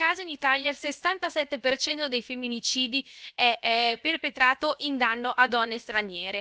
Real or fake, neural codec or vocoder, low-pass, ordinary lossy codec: fake; codec, 16 kHz, 0.7 kbps, FocalCodec; none; none